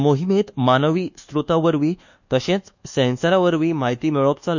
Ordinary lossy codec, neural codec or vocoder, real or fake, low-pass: none; codec, 24 kHz, 1.2 kbps, DualCodec; fake; 7.2 kHz